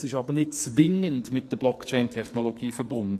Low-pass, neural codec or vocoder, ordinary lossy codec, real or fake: 14.4 kHz; codec, 44.1 kHz, 2.6 kbps, SNAC; AAC, 64 kbps; fake